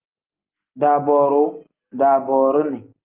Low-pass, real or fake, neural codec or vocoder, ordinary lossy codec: 3.6 kHz; real; none; Opus, 32 kbps